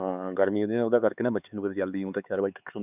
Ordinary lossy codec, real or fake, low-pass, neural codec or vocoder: none; fake; 3.6 kHz; codec, 16 kHz, 4 kbps, X-Codec, HuBERT features, trained on LibriSpeech